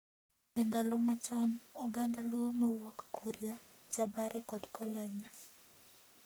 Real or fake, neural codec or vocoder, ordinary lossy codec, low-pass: fake; codec, 44.1 kHz, 1.7 kbps, Pupu-Codec; none; none